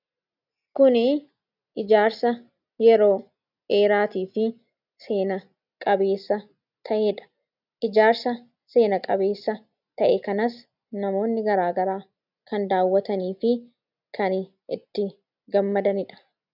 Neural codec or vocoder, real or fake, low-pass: none; real; 5.4 kHz